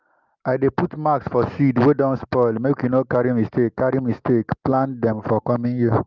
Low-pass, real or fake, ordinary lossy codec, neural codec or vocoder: 7.2 kHz; real; Opus, 32 kbps; none